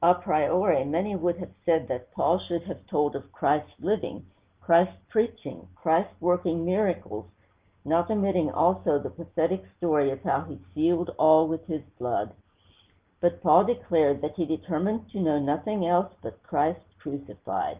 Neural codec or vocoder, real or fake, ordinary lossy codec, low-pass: none; real; Opus, 32 kbps; 3.6 kHz